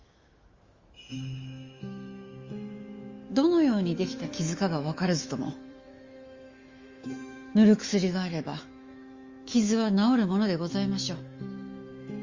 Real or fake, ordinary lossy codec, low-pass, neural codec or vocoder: fake; Opus, 32 kbps; 7.2 kHz; autoencoder, 48 kHz, 128 numbers a frame, DAC-VAE, trained on Japanese speech